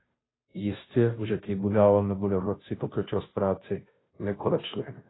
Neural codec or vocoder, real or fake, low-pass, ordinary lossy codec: codec, 16 kHz, 0.5 kbps, FunCodec, trained on Chinese and English, 25 frames a second; fake; 7.2 kHz; AAC, 16 kbps